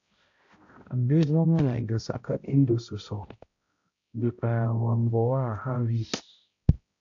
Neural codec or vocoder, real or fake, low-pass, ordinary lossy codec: codec, 16 kHz, 0.5 kbps, X-Codec, HuBERT features, trained on balanced general audio; fake; 7.2 kHz; AAC, 48 kbps